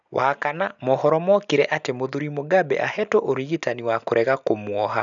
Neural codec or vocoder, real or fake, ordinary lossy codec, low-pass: none; real; none; 7.2 kHz